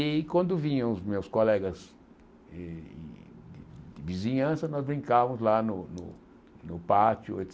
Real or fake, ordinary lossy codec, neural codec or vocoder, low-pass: real; none; none; none